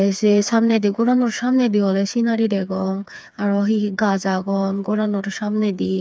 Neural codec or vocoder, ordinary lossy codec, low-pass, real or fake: codec, 16 kHz, 4 kbps, FreqCodec, smaller model; none; none; fake